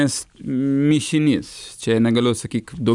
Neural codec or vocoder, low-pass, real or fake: none; 10.8 kHz; real